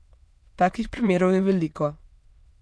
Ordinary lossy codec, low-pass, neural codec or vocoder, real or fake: none; none; autoencoder, 22.05 kHz, a latent of 192 numbers a frame, VITS, trained on many speakers; fake